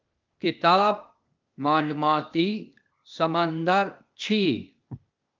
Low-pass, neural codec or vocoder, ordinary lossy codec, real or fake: 7.2 kHz; codec, 16 kHz, 0.8 kbps, ZipCodec; Opus, 24 kbps; fake